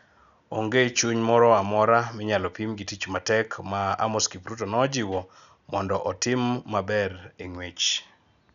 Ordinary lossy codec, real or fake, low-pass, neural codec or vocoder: none; real; 7.2 kHz; none